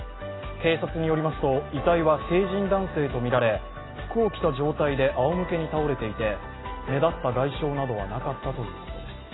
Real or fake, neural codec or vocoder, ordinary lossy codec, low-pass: real; none; AAC, 16 kbps; 7.2 kHz